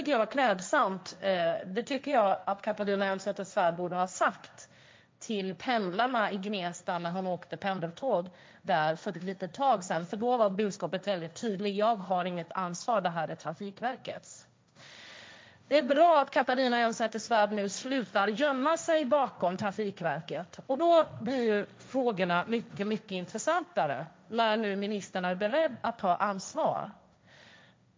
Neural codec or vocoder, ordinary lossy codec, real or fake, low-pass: codec, 16 kHz, 1.1 kbps, Voila-Tokenizer; none; fake; none